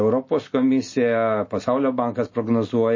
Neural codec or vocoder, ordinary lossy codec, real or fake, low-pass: none; MP3, 32 kbps; real; 7.2 kHz